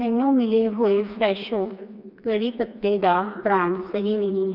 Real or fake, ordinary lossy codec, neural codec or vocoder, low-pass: fake; none; codec, 16 kHz, 2 kbps, FreqCodec, smaller model; 5.4 kHz